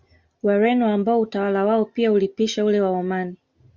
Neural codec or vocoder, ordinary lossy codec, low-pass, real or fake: none; Opus, 64 kbps; 7.2 kHz; real